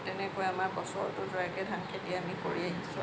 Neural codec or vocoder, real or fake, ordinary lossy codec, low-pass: none; real; none; none